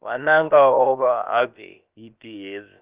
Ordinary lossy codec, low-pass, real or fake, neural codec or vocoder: Opus, 24 kbps; 3.6 kHz; fake; codec, 16 kHz, about 1 kbps, DyCAST, with the encoder's durations